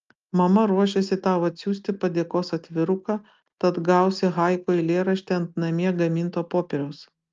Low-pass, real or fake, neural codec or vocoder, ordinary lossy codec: 7.2 kHz; real; none; Opus, 24 kbps